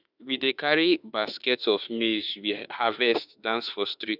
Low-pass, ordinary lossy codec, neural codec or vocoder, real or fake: 5.4 kHz; none; autoencoder, 48 kHz, 32 numbers a frame, DAC-VAE, trained on Japanese speech; fake